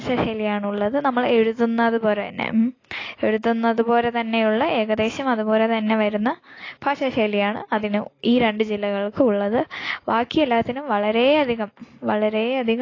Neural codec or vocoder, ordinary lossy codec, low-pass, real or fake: none; AAC, 32 kbps; 7.2 kHz; real